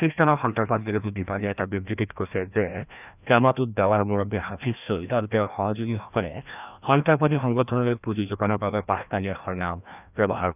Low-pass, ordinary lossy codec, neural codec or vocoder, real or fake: 3.6 kHz; none; codec, 16 kHz, 1 kbps, FreqCodec, larger model; fake